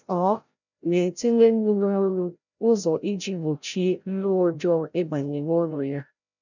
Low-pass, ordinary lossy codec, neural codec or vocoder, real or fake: 7.2 kHz; none; codec, 16 kHz, 0.5 kbps, FreqCodec, larger model; fake